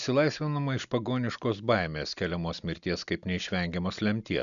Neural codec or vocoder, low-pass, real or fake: none; 7.2 kHz; real